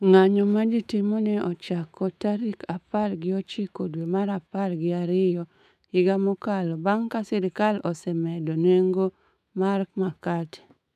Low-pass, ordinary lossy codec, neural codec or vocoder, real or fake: 14.4 kHz; none; autoencoder, 48 kHz, 128 numbers a frame, DAC-VAE, trained on Japanese speech; fake